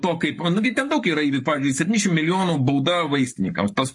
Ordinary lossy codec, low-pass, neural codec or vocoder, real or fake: MP3, 48 kbps; 10.8 kHz; codec, 44.1 kHz, 7.8 kbps, Pupu-Codec; fake